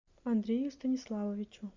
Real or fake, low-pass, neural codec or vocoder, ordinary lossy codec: real; 7.2 kHz; none; MP3, 64 kbps